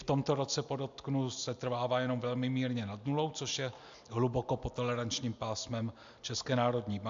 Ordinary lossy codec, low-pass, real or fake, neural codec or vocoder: AAC, 64 kbps; 7.2 kHz; real; none